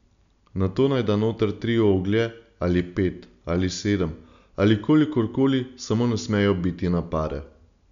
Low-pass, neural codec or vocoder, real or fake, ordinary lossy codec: 7.2 kHz; none; real; MP3, 96 kbps